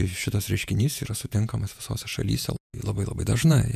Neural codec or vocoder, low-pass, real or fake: vocoder, 48 kHz, 128 mel bands, Vocos; 14.4 kHz; fake